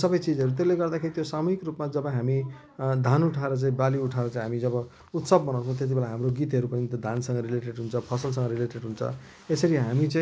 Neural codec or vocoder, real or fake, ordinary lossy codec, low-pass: none; real; none; none